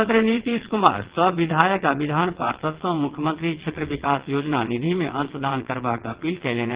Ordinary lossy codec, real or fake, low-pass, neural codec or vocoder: Opus, 16 kbps; fake; 3.6 kHz; vocoder, 22.05 kHz, 80 mel bands, WaveNeXt